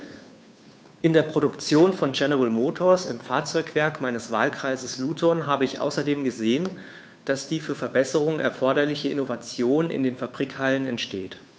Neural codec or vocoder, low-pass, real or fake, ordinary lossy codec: codec, 16 kHz, 2 kbps, FunCodec, trained on Chinese and English, 25 frames a second; none; fake; none